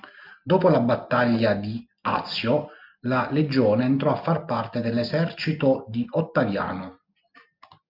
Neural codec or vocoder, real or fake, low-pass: none; real; 5.4 kHz